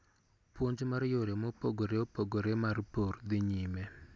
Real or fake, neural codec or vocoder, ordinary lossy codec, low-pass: real; none; none; none